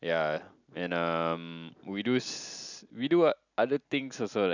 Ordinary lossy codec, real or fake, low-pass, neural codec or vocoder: none; real; 7.2 kHz; none